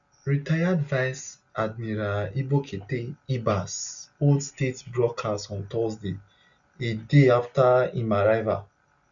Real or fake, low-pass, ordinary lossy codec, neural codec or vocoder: real; 7.2 kHz; none; none